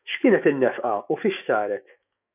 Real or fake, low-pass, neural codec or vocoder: fake; 3.6 kHz; autoencoder, 48 kHz, 128 numbers a frame, DAC-VAE, trained on Japanese speech